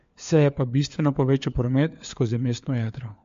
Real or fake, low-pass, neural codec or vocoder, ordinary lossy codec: fake; 7.2 kHz; codec, 16 kHz, 4 kbps, FunCodec, trained on LibriTTS, 50 frames a second; MP3, 48 kbps